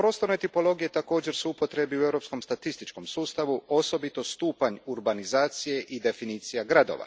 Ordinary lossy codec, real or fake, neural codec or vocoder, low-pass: none; real; none; none